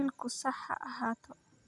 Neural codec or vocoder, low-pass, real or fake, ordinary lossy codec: none; 10.8 kHz; real; none